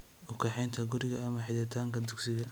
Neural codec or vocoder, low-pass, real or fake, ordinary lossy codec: none; none; real; none